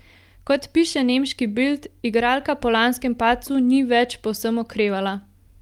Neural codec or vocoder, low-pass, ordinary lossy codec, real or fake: none; 19.8 kHz; Opus, 32 kbps; real